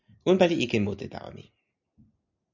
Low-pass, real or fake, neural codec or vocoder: 7.2 kHz; real; none